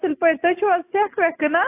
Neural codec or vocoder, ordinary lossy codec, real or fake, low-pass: none; AAC, 24 kbps; real; 3.6 kHz